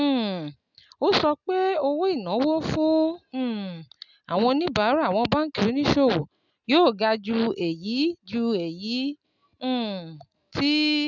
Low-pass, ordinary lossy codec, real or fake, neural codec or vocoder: 7.2 kHz; none; real; none